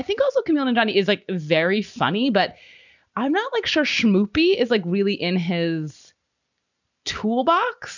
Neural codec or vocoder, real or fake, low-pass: none; real; 7.2 kHz